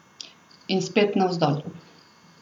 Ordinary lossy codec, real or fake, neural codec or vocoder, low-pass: none; real; none; 19.8 kHz